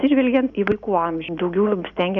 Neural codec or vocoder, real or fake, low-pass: none; real; 7.2 kHz